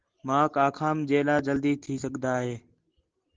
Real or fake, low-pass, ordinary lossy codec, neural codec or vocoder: real; 7.2 kHz; Opus, 16 kbps; none